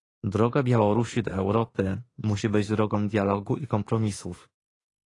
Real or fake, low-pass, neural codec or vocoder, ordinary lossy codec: fake; 10.8 kHz; autoencoder, 48 kHz, 32 numbers a frame, DAC-VAE, trained on Japanese speech; AAC, 32 kbps